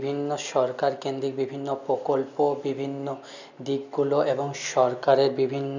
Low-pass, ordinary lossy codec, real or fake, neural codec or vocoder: 7.2 kHz; none; real; none